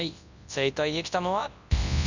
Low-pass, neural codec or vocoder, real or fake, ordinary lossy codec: 7.2 kHz; codec, 24 kHz, 0.9 kbps, WavTokenizer, large speech release; fake; none